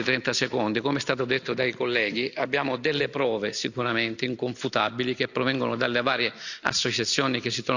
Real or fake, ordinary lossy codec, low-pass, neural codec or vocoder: real; none; 7.2 kHz; none